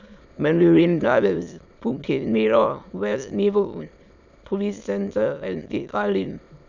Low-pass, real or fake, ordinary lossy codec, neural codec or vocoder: 7.2 kHz; fake; none; autoencoder, 22.05 kHz, a latent of 192 numbers a frame, VITS, trained on many speakers